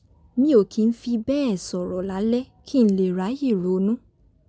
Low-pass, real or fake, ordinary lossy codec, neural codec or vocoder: none; real; none; none